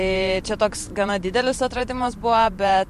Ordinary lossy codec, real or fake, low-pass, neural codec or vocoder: MP3, 64 kbps; fake; 14.4 kHz; vocoder, 44.1 kHz, 128 mel bands every 512 samples, BigVGAN v2